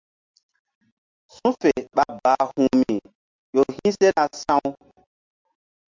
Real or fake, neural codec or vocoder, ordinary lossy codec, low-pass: real; none; MP3, 64 kbps; 7.2 kHz